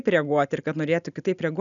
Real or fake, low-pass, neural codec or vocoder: real; 7.2 kHz; none